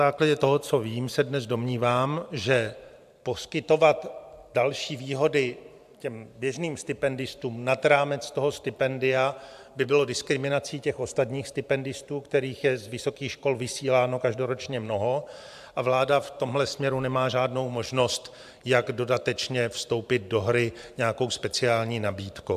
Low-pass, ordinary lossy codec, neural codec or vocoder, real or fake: 14.4 kHz; AAC, 96 kbps; vocoder, 44.1 kHz, 128 mel bands every 512 samples, BigVGAN v2; fake